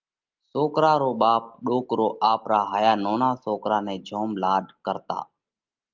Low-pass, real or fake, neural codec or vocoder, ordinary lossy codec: 7.2 kHz; real; none; Opus, 32 kbps